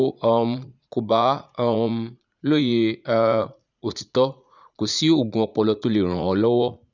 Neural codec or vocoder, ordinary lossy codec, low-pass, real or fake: vocoder, 44.1 kHz, 80 mel bands, Vocos; none; 7.2 kHz; fake